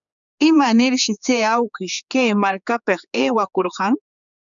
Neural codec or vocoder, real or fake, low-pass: codec, 16 kHz, 4 kbps, X-Codec, HuBERT features, trained on general audio; fake; 7.2 kHz